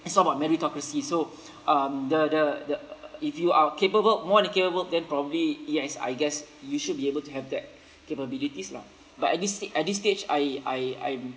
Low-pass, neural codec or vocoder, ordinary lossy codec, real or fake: none; none; none; real